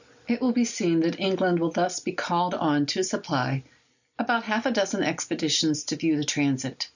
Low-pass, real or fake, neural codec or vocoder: 7.2 kHz; real; none